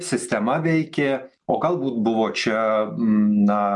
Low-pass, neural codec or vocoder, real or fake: 10.8 kHz; none; real